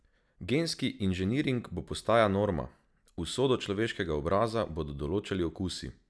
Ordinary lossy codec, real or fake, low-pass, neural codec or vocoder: none; real; none; none